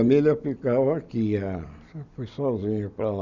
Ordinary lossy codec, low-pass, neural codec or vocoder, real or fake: none; 7.2 kHz; none; real